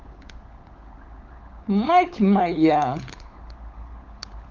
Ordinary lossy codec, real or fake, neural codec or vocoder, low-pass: Opus, 24 kbps; fake; codec, 16 kHz, 16 kbps, FunCodec, trained on LibriTTS, 50 frames a second; 7.2 kHz